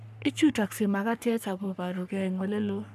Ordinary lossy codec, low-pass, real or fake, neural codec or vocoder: none; 14.4 kHz; fake; codec, 44.1 kHz, 3.4 kbps, Pupu-Codec